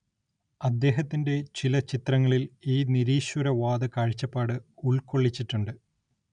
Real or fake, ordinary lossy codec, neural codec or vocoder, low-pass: real; none; none; 9.9 kHz